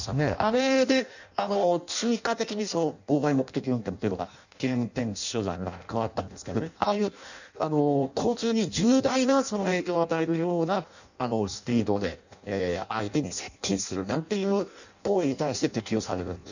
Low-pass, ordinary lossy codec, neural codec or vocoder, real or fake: 7.2 kHz; none; codec, 16 kHz in and 24 kHz out, 0.6 kbps, FireRedTTS-2 codec; fake